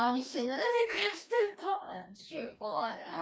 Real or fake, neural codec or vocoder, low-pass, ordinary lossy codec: fake; codec, 16 kHz, 1 kbps, FreqCodec, larger model; none; none